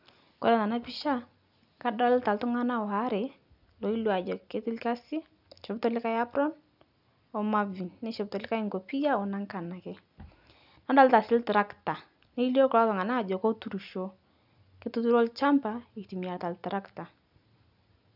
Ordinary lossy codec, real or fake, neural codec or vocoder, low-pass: none; real; none; 5.4 kHz